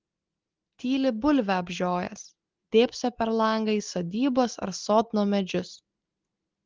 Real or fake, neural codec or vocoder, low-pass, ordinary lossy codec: real; none; 7.2 kHz; Opus, 16 kbps